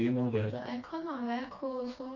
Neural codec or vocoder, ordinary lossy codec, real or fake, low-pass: codec, 16 kHz, 2 kbps, FreqCodec, smaller model; none; fake; 7.2 kHz